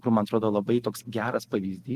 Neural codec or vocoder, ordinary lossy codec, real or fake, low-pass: none; Opus, 24 kbps; real; 14.4 kHz